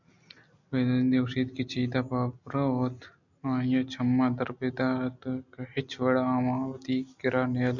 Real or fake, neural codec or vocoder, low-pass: real; none; 7.2 kHz